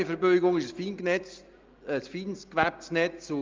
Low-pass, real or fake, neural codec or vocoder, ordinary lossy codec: 7.2 kHz; real; none; Opus, 32 kbps